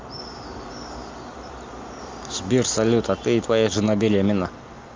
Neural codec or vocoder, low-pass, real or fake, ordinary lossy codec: none; 7.2 kHz; real; Opus, 32 kbps